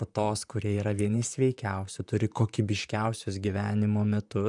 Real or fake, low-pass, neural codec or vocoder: fake; 10.8 kHz; vocoder, 44.1 kHz, 128 mel bands, Pupu-Vocoder